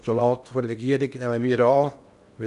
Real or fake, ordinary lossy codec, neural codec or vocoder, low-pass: fake; none; codec, 16 kHz in and 24 kHz out, 0.8 kbps, FocalCodec, streaming, 65536 codes; 10.8 kHz